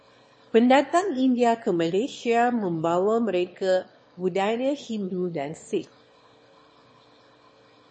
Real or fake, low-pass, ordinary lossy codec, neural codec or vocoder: fake; 9.9 kHz; MP3, 32 kbps; autoencoder, 22.05 kHz, a latent of 192 numbers a frame, VITS, trained on one speaker